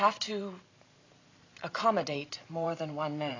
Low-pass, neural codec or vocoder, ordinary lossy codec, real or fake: 7.2 kHz; vocoder, 44.1 kHz, 128 mel bands every 512 samples, BigVGAN v2; AAC, 32 kbps; fake